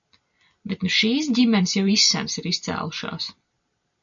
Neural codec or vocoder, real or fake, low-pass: none; real; 7.2 kHz